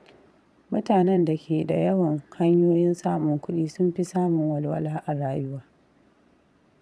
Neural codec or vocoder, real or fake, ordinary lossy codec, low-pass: vocoder, 22.05 kHz, 80 mel bands, Vocos; fake; none; none